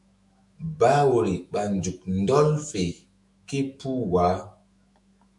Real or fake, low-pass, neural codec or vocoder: fake; 10.8 kHz; autoencoder, 48 kHz, 128 numbers a frame, DAC-VAE, trained on Japanese speech